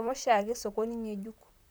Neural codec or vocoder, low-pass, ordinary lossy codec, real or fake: vocoder, 44.1 kHz, 128 mel bands, Pupu-Vocoder; none; none; fake